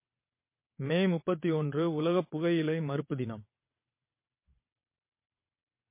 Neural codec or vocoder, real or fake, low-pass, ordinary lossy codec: vocoder, 22.05 kHz, 80 mel bands, WaveNeXt; fake; 3.6 kHz; MP3, 24 kbps